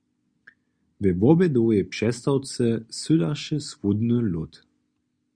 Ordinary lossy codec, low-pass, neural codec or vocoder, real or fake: Opus, 64 kbps; 9.9 kHz; none; real